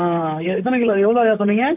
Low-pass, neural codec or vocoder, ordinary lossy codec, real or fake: 3.6 kHz; vocoder, 44.1 kHz, 128 mel bands, Pupu-Vocoder; none; fake